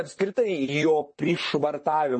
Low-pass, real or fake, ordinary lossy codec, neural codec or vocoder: 9.9 kHz; fake; MP3, 32 kbps; vocoder, 44.1 kHz, 128 mel bands, Pupu-Vocoder